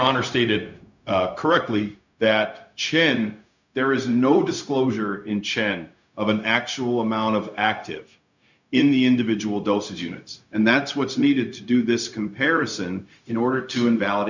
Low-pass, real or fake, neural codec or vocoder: 7.2 kHz; fake; codec, 16 kHz, 0.4 kbps, LongCat-Audio-Codec